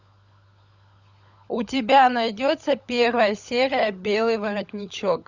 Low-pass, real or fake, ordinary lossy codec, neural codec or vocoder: 7.2 kHz; fake; Opus, 64 kbps; codec, 16 kHz, 16 kbps, FunCodec, trained on LibriTTS, 50 frames a second